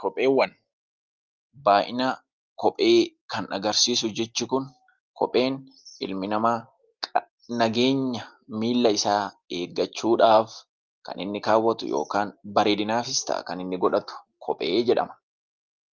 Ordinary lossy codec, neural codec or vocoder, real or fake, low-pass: Opus, 24 kbps; none; real; 7.2 kHz